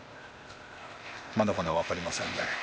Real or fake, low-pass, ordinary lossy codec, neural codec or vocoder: fake; none; none; codec, 16 kHz, 0.8 kbps, ZipCodec